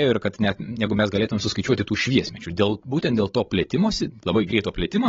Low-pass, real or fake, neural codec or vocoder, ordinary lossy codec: 7.2 kHz; fake; codec, 16 kHz, 16 kbps, FreqCodec, larger model; AAC, 24 kbps